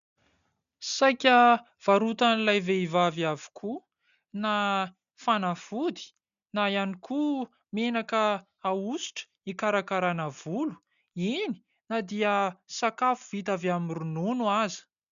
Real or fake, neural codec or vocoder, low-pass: real; none; 7.2 kHz